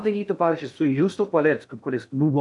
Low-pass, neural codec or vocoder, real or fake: 10.8 kHz; codec, 16 kHz in and 24 kHz out, 0.6 kbps, FocalCodec, streaming, 2048 codes; fake